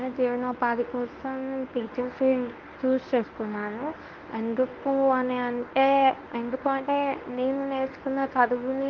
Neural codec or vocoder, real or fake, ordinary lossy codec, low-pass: codec, 24 kHz, 0.9 kbps, WavTokenizer, medium speech release version 1; fake; Opus, 32 kbps; 7.2 kHz